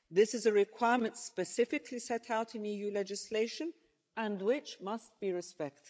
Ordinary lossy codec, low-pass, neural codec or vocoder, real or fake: none; none; codec, 16 kHz, 16 kbps, FreqCodec, larger model; fake